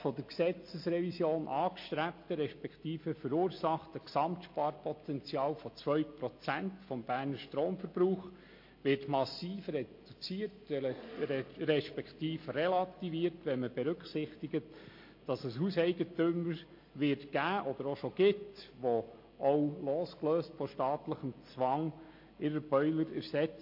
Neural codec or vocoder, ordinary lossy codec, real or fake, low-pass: none; MP3, 32 kbps; real; 5.4 kHz